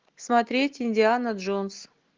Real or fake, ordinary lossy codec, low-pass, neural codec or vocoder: real; Opus, 16 kbps; 7.2 kHz; none